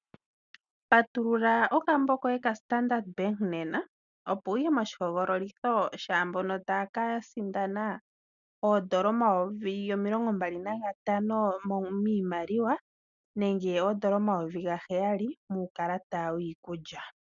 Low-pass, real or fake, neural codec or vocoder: 7.2 kHz; real; none